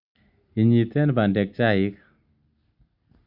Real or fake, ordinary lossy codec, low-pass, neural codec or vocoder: real; Opus, 64 kbps; 5.4 kHz; none